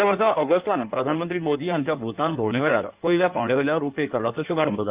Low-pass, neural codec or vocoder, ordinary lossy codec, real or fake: 3.6 kHz; codec, 16 kHz in and 24 kHz out, 1.1 kbps, FireRedTTS-2 codec; Opus, 32 kbps; fake